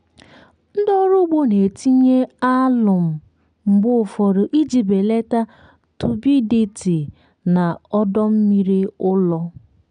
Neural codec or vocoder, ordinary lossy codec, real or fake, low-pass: none; none; real; 9.9 kHz